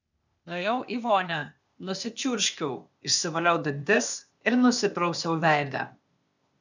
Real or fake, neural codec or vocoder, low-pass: fake; codec, 16 kHz, 0.8 kbps, ZipCodec; 7.2 kHz